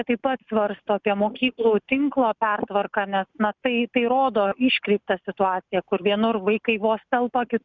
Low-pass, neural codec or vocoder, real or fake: 7.2 kHz; none; real